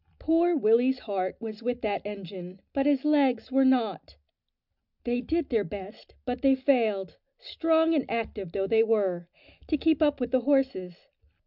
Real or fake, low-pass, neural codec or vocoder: real; 5.4 kHz; none